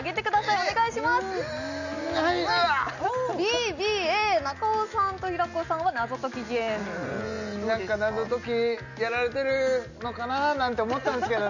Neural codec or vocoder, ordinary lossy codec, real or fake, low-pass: none; none; real; 7.2 kHz